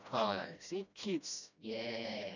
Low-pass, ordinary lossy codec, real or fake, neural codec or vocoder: 7.2 kHz; none; fake; codec, 16 kHz, 1 kbps, FreqCodec, smaller model